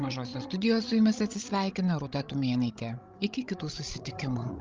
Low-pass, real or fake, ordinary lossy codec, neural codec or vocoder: 7.2 kHz; fake; Opus, 32 kbps; codec, 16 kHz, 16 kbps, FreqCodec, larger model